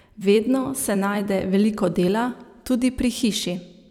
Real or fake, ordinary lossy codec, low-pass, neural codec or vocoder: fake; none; 19.8 kHz; vocoder, 44.1 kHz, 128 mel bands every 256 samples, BigVGAN v2